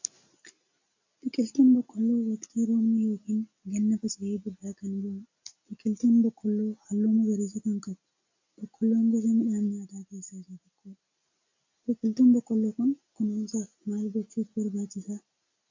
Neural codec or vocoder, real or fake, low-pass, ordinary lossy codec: none; real; 7.2 kHz; AAC, 48 kbps